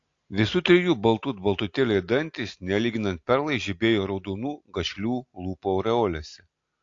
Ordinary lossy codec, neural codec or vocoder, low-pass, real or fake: AAC, 48 kbps; none; 7.2 kHz; real